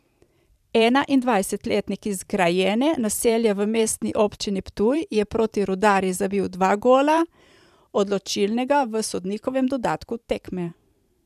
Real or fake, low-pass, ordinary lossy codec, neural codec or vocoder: fake; 14.4 kHz; none; vocoder, 44.1 kHz, 128 mel bands every 512 samples, BigVGAN v2